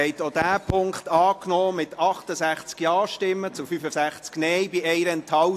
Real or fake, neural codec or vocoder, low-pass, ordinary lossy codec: real; none; 14.4 kHz; AAC, 64 kbps